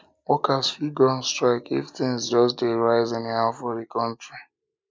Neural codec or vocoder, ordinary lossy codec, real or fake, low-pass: none; none; real; none